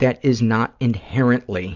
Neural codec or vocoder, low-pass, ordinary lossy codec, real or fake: none; 7.2 kHz; Opus, 64 kbps; real